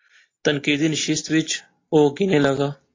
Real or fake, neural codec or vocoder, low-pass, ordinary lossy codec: real; none; 7.2 kHz; AAC, 32 kbps